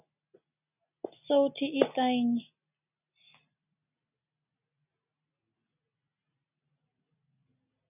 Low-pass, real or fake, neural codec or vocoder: 3.6 kHz; real; none